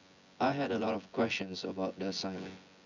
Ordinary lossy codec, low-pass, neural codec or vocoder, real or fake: none; 7.2 kHz; vocoder, 24 kHz, 100 mel bands, Vocos; fake